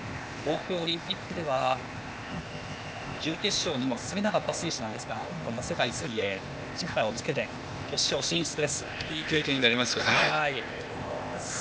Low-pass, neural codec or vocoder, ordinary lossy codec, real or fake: none; codec, 16 kHz, 0.8 kbps, ZipCodec; none; fake